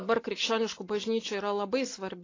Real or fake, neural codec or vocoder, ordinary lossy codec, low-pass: real; none; AAC, 32 kbps; 7.2 kHz